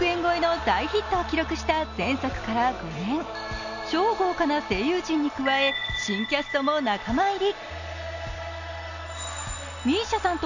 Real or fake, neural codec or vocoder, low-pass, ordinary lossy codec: real; none; 7.2 kHz; none